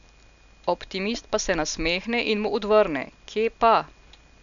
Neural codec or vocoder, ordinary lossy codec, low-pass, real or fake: none; none; 7.2 kHz; real